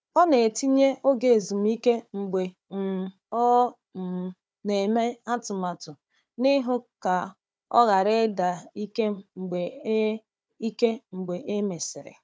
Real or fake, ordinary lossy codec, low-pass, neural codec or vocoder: fake; none; none; codec, 16 kHz, 4 kbps, FunCodec, trained on Chinese and English, 50 frames a second